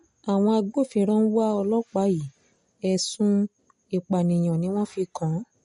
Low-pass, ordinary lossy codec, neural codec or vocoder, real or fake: 10.8 kHz; MP3, 48 kbps; none; real